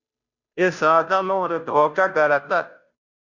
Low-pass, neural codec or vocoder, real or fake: 7.2 kHz; codec, 16 kHz, 0.5 kbps, FunCodec, trained on Chinese and English, 25 frames a second; fake